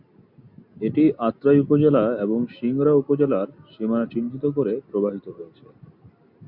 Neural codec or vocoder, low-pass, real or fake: none; 5.4 kHz; real